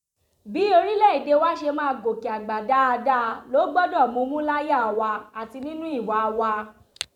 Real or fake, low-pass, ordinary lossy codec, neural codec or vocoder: fake; 19.8 kHz; none; vocoder, 44.1 kHz, 128 mel bands every 512 samples, BigVGAN v2